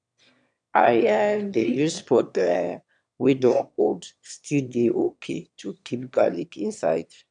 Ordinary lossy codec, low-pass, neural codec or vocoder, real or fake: none; 9.9 kHz; autoencoder, 22.05 kHz, a latent of 192 numbers a frame, VITS, trained on one speaker; fake